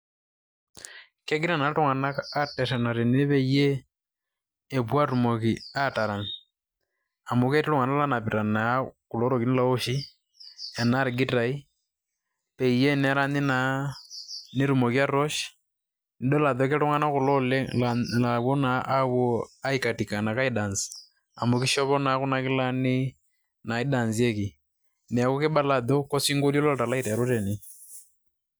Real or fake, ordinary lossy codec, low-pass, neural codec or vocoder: real; none; none; none